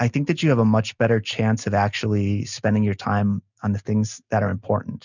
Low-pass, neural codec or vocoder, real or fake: 7.2 kHz; none; real